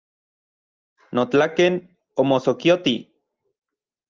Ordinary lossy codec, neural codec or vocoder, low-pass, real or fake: Opus, 32 kbps; none; 7.2 kHz; real